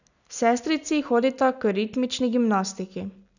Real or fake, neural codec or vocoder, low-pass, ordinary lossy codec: real; none; 7.2 kHz; none